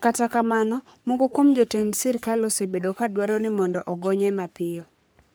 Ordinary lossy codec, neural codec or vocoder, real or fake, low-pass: none; codec, 44.1 kHz, 3.4 kbps, Pupu-Codec; fake; none